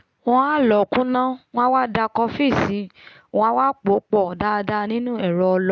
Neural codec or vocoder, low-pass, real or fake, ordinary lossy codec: none; none; real; none